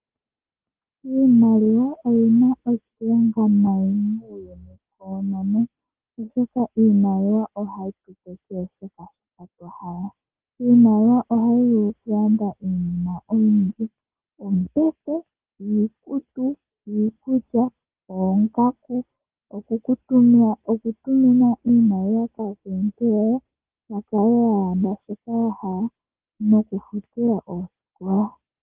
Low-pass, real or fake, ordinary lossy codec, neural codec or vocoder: 3.6 kHz; real; Opus, 16 kbps; none